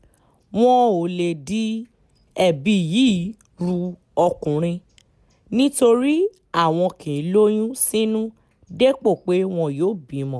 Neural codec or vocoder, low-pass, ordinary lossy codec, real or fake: none; none; none; real